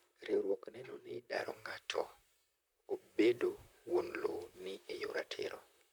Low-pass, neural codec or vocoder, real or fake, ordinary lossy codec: none; vocoder, 44.1 kHz, 128 mel bands, Pupu-Vocoder; fake; none